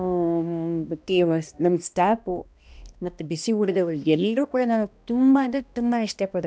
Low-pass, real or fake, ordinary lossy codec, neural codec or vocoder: none; fake; none; codec, 16 kHz, 1 kbps, X-Codec, HuBERT features, trained on balanced general audio